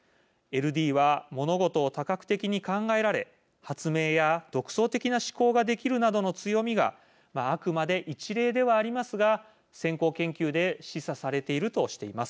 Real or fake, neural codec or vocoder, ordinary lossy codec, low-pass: real; none; none; none